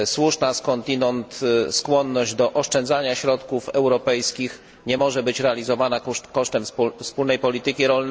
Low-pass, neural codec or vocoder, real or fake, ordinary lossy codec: none; none; real; none